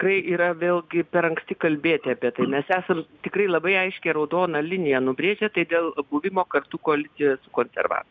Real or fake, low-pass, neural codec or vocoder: real; 7.2 kHz; none